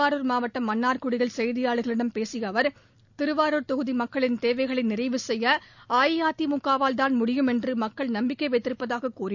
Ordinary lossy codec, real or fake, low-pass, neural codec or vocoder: none; real; 7.2 kHz; none